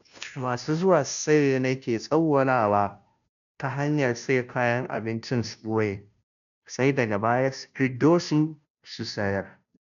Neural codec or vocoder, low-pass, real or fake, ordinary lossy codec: codec, 16 kHz, 0.5 kbps, FunCodec, trained on Chinese and English, 25 frames a second; 7.2 kHz; fake; none